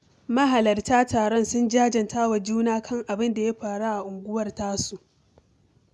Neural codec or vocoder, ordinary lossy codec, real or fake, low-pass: none; none; real; none